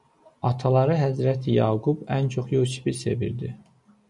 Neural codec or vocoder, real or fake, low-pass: none; real; 10.8 kHz